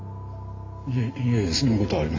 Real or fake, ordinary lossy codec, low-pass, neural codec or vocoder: real; AAC, 48 kbps; 7.2 kHz; none